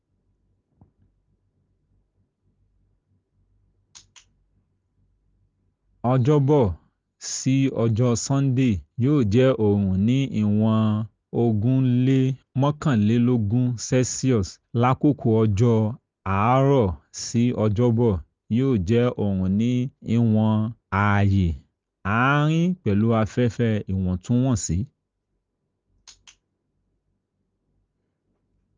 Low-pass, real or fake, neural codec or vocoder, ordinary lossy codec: 7.2 kHz; real; none; Opus, 24 kbps